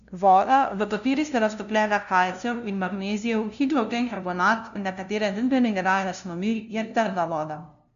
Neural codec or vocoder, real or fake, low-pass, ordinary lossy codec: codec, 16 kHz, 0.5 kbps, FunCodec, trained on LibriTTS, 25 frames a second; fake; 7.2 kHz; none